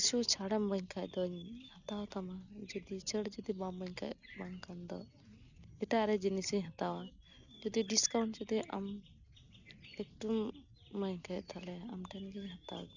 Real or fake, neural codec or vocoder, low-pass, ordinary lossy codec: real; none; 7.2 kHz; none